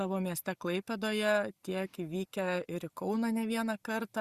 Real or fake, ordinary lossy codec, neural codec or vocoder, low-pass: real; Opus, 64 kbps; none; 14.4 kHz